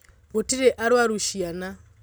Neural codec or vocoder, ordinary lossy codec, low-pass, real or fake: none; none; none; real